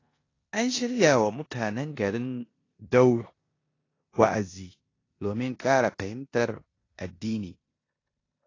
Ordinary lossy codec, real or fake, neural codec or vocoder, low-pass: AAC, 32 kbps; fake; codec, 16 kHz in and 24 kHz out, 0.9 kbps, LongCat-Audio-Codec, four codebook decoder; 7.2 kHz